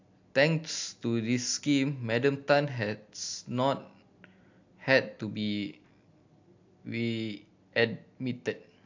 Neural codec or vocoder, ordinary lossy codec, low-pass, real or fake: none; AAC, 48 kbps; 7.2 kHz; real